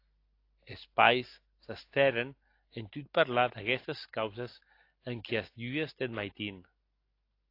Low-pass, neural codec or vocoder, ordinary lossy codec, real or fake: 5.4 kHz; none; AAC, 32 kbps; real